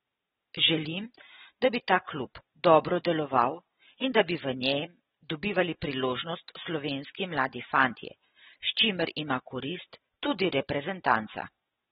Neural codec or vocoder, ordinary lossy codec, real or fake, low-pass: none; AAC, 16 kbps; real; 19.8 kHz